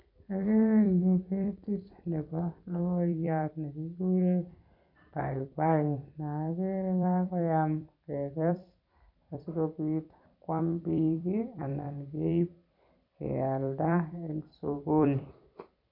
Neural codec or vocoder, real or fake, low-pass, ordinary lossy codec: vocoder, 44.1 kHz, 128 mel bands every 256 samples, BigVGAN v2; fake; 5.4 kHz; MP3, 48 kbps